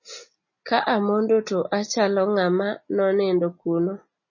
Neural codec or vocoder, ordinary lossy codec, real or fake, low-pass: none; MP3, 32 kbps; real; 7.2 kHz